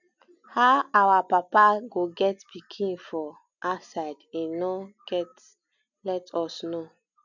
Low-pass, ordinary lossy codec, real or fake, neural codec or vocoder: 7.2 kHz; none; real; none